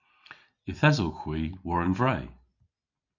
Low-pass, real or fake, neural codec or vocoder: 7.2 kHz; real; none